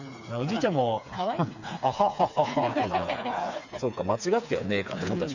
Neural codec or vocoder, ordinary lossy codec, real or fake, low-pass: codec, 16 kHz, 4 kbps, FreqCodec, smaller model; none; fake; 7.2 kHz